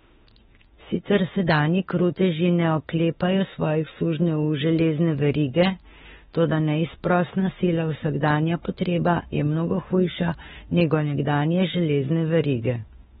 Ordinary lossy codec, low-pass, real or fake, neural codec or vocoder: AAC, 16 kbps; 19.8 kHz; fake; autoencoder, 48 kHz, 32 numbers a frame, DAC-VAE, trained on Japanese speech